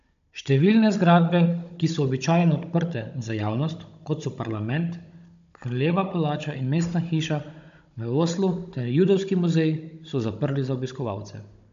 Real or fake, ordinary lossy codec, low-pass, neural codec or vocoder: fake; AAC, 64 kbps; 7.2 kHz; codec, 16 kHz, 16 kbps, FunCodec, trained on Chinese and English, 50 frames a second